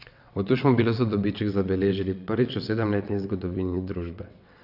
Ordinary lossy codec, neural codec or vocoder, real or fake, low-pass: none; vocoder, 22.05 kHz, 80 mel bands, WaveNeXt; fake; 5.4 kHz